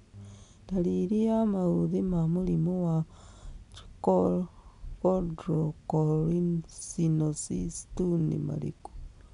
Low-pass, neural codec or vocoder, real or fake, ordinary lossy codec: 10.8 kHz; none; real; none